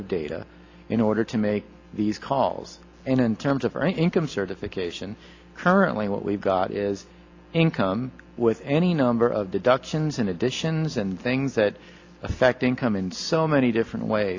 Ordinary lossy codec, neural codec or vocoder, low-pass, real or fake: AAC, 48 kbps; none; 7.2 kHz; real